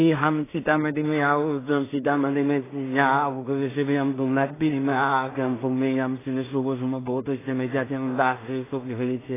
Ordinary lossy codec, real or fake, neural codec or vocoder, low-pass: AAC, 16 kbps; fake; codec, 16 kHz in and 24 kHz out, 0.4 kbps, LongCat-Audio-Codec, two codebook decoder; 3.6 kHz